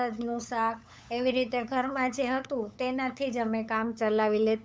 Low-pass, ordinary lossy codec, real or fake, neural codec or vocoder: none; none; fake; codec, 16 kHz, 8 kbps, FunCodec, trained on LibriTTS, 25 frames a second